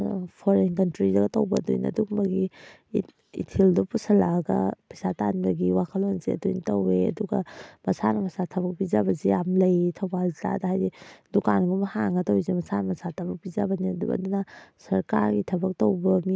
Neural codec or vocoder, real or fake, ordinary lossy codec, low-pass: none; real; none; none